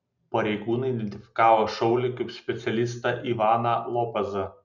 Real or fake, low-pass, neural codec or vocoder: real; 7.2 kHz; none